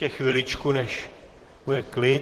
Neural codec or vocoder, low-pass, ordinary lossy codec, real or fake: vocoder, 44.1 kHz, 128 mel bands, Pupu-Vocoder; 14.4 kHz; Opus, 16 kbps; fake